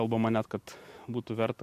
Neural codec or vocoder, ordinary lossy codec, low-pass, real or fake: none; MP3, 64 kbps; 14.4 kHz; real